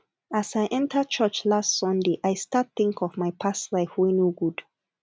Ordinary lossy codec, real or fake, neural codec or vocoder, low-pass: none; real; none; none